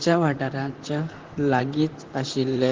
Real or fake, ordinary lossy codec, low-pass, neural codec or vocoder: fake; Opus, 16 kbps; 7.2 kHz; vocoder, 44.1 kHz, 128 mel bands, Pupu-Vocoder